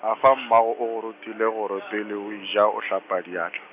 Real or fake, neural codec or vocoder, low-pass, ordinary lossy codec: real; none; 3.6 kHz; none